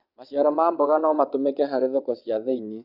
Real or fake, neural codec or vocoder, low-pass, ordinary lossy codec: fake; vocoder, 22.05 kHz, 80 mel bands, WaveNeXt; 5.4 kHz; none